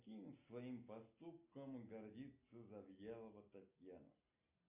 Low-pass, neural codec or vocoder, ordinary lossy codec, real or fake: 3.6 kHz; none; MP3, 32 kbps; real